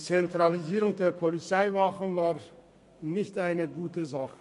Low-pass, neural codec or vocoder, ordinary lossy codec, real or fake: 14.4 kHz; codec, 32 kHz, 1.9 kbps, SNAC; MP3, 48 kbps; fake